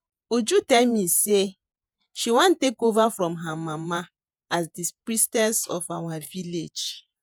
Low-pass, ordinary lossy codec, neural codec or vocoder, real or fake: none; none; vocoder, 48 kHz, 128 mel bands, Vocos; fake